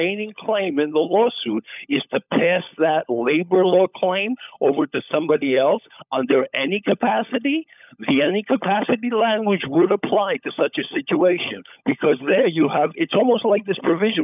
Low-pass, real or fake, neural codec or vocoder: 3.6 kHz; fake; codec, 16 kHz, 16 kbps, FunCodec, trained on LibriTTS, 50 frames a second